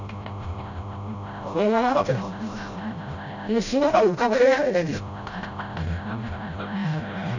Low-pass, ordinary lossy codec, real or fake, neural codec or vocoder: 7.2 kHz; none; fake; codec, 16 kHz, 0.5 kbps, FreqCodec, smaller model